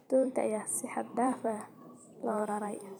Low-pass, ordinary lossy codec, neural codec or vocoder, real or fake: none; none; vocoder, 44.1 kHz, 128 mel bands every 512 samples, BigVGAN v2; fake